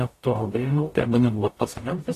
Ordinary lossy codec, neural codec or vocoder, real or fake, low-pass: AAC, 48 kbps; codec, 44.1 kHz, 0.9 kbps, DAC; fake; 14.4 kHz